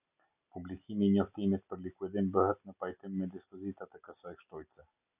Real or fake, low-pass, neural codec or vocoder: real; 3.6 kHz; none